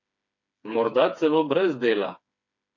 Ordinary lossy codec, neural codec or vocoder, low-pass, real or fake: none; codec, 16 kHz, 4 kbps, FreqCodec, smaller model; 7.2 kHz; fake